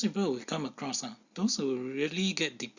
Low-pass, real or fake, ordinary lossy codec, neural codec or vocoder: 7.2 kHz; real; Opus, 64 kbps; none